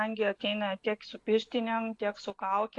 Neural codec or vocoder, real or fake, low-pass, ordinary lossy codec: none; real; 10.8 kHz; AAC, 48 kbps